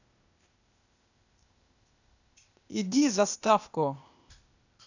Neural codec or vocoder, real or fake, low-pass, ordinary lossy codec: codec, 16 kHz, 0.8 kbps, ZipCodec; fake; 7.2 kHz; none